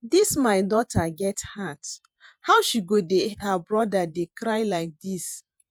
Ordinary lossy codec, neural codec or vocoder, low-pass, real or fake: none; vocoder, 48 kHz, 128 mel bands, Vocos; none; fake